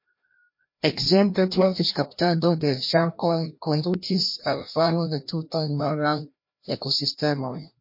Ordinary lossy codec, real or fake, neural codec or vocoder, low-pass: MP3, 32 kbps; fake; codec, 16 kHz, 1 kbps, FreqCodec, larger model; 5.4 kHz